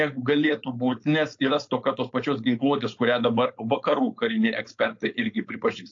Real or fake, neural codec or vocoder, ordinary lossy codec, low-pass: fake; codec, 16 kHz, 4.8 kbps, FACodec; AAC, 48 kbps; 7.2 kHz